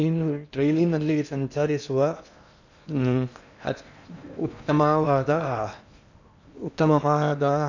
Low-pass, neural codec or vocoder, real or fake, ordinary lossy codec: 7.2 kHz; codec, 16 kHz in and 24 kHz out, 0.8 kbps, FocalCodec, streaming, 65536 codes; fake; none